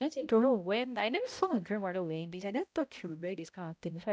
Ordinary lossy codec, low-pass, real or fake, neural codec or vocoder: none; none; fake; codec, 16 kHz, 0.5 kbps, X-Codec, HuBERT features, trained on balanced general audio